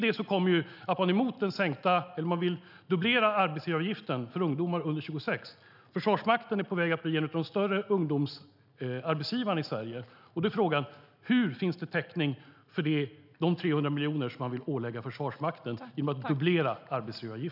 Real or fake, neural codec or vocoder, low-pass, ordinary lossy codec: real; none; 5.4 kHz; none